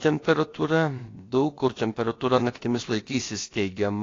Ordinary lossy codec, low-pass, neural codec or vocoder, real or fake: AAC, 32 kbps; 7.2 kHz; codec, 16 kHz, about 1 kbps, DyCAST, with the encoder's durations; fake